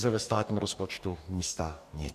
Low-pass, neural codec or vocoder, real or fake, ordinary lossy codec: 14.4 kHz; codec, 44.1 kHz, 2.6 kbps, DAC; fake; MP3, 96 kbps